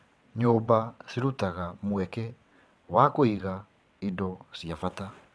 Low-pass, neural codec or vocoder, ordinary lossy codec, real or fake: none; vocoder, 22.05 kHz, 80 mel bands, WaveNeXt; none; fake